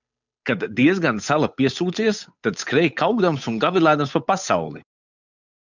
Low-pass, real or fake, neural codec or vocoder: 7.2 kHz; fake; codec, 16 kHz, 8 kbps, FunCodec, trained on Chinese and English, 25 frames a second